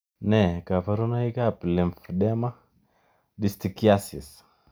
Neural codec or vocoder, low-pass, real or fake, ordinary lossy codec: none; none; real; none